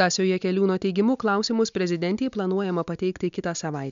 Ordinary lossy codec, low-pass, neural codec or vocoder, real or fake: MP3, 64 kbps; 7.2 kHz; none; real